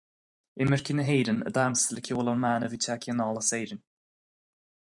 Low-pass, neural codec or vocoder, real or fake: 10.8 kHz; vocoder, 44.1 kHz, 128 mel bands every 512 samples, BigVGAN v2; fake